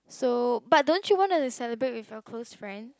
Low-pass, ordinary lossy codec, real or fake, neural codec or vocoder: none; none; real; none